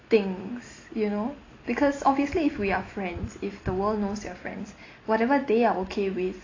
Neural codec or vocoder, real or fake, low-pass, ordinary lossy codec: none; real; 7.2 kHz; AAC, 32 kbps